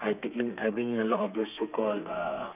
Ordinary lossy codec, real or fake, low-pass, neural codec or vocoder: none; fake; 3.6 kHz; codec, 32 kHz, 1.9 kbps, SNAC